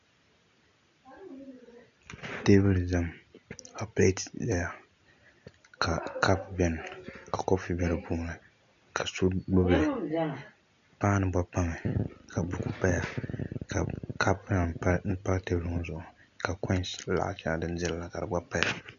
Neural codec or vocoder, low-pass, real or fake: none; 7.2 kHz; real